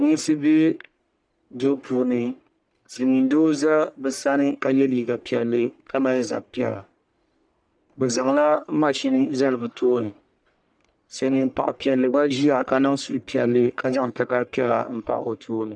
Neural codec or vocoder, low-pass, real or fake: codec, 44.1 kHz, 1.7 kbps, Pupu-Codec; 9.9 kHz; fake